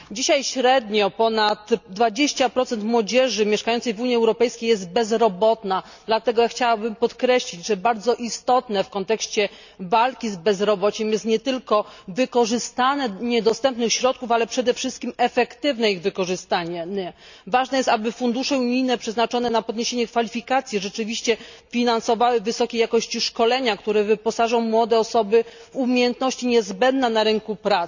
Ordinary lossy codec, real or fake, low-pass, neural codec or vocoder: none; real; 7.2 kHz; none